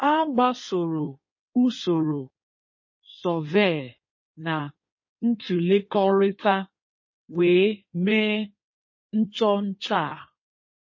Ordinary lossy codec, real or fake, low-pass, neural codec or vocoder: MP3, 32 kbps; fake; 7.2 kHz; codec, 16 kHz in and 24 kHz out, 1.1 kbps, FireRedTTS-2 codec